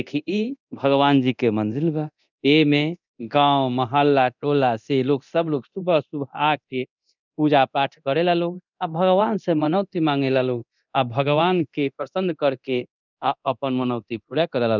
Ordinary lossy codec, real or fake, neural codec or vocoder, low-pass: none; fake; codec, 24 kHz, 0.9 kbps, DualCodec; 7.2 kHz